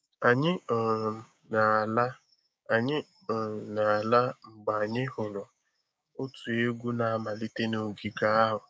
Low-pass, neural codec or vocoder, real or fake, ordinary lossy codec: none; codec, 16 kHz, 6 kbps, DAC; fake; none